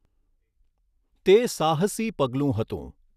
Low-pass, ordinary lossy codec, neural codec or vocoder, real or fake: 14.4 kHz; none; none; real